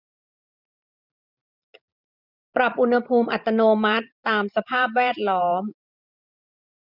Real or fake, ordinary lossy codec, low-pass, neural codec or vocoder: fake; none; 5.4 kHz; vocoder, 44.1 kHz, 128 mel bands every 512 samples, BigVGAN v2